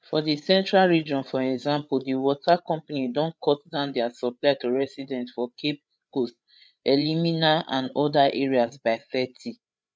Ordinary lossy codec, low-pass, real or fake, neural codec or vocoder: none; none; fake; codec, 16 kHz, 8 kbps, FreqCodec, larger model